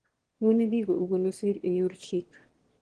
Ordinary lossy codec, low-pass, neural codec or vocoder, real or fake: Opus, 16 kbps; 9.9 kHz; autoencoder, 22.05 kHz, a latent of 192 numbers a frame, VITS, trained on one speaker; fake